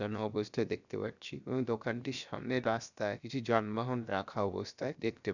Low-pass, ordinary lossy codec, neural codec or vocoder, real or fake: 7.2 kHz; none; codec, 16 kHz, 0.7 kbps, FocalCodec; fake